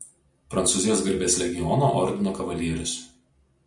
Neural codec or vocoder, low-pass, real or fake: none; 10.8 kHz; real